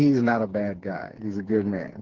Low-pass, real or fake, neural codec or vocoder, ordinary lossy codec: 7.2 kHz; fake; codec, 16 kHz, 4 kbps, FreqCodec, smaller model; Opus, 16 kbps